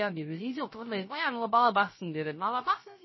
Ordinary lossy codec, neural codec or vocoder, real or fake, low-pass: MP3, 24 kbps; codec, 16 kHz, 0.3 kbps, FocalCodec; fake; 7.2 kHz